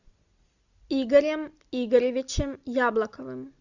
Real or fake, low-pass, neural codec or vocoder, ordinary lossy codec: real; 7.2 kHz; none; Opus, 64 kbps